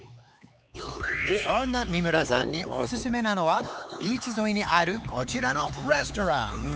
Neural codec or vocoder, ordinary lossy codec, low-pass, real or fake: codec, 16 kHz, 4 kbps, X-Codec, HuBERT features, trained on LibriSpeech; none; none; fake